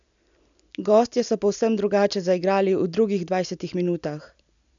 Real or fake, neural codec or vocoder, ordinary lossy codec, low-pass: real; none; none; 7.2 kHz